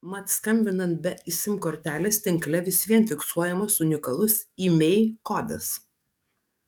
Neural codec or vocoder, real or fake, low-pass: codec, 44.1 kHz, 7.8 kbps, DAC; fake; 19.8 kHz